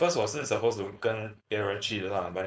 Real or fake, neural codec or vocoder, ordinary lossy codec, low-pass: fake; codec, 16 kHz, 4.8 kbps, FACodec; none; none